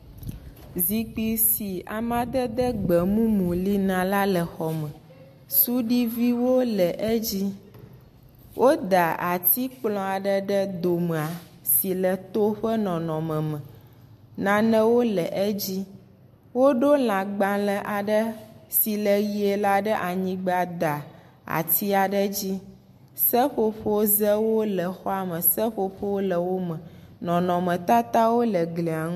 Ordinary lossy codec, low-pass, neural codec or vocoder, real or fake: MP3, 64 kbps; 14.4 kHz; none; real